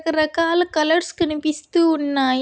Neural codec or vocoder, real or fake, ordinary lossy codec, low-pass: none; real; none; none